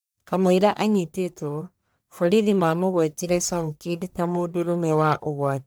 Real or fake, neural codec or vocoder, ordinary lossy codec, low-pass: fake; codec, 44.1 kHz, 1.7 kbps, Pupu-Codec; none; none